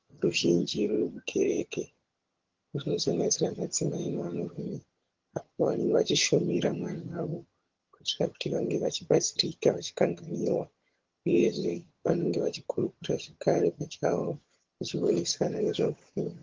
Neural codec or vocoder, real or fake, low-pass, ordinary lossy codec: vocoder, 22.05 kHz, 80 mel bands, HiFi-GAN; fake; 7.2 kHz; Opus, 16 kbps